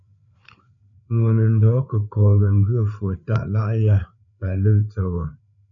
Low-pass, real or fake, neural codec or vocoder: 7.2 kHz; fake; codec, 16 kHz, 4 kbps, FreqCodec, larger model